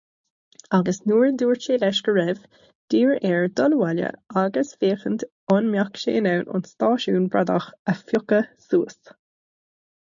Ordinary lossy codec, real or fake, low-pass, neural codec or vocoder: MP3, 64 kbps; real; 7.2 kHz; none